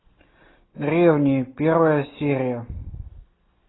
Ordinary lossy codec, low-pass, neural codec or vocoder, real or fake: AAC, 16 kbps; 7.2 kHz; none; real